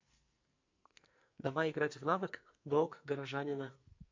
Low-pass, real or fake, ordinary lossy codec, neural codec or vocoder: 7.2 kHz; fake; MP3, 48 kbps; codec, 44.1 kHz, 2.6 kbps, SNAC